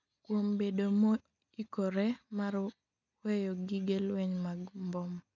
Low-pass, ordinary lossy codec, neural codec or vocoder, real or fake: 7.2 kHz; none; none; real